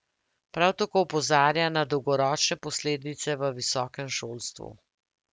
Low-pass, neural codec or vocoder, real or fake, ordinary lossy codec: none; none; real; none